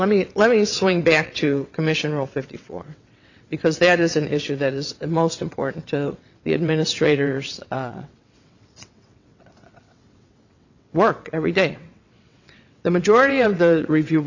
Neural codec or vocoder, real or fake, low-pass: vocoder, 22.05 kHz, 80 mel bands, WaveNeXt; fake; 7.2 kHz